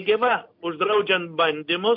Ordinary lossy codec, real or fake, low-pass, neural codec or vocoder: MP3, 48 kbps; real; 5.4 kHz; none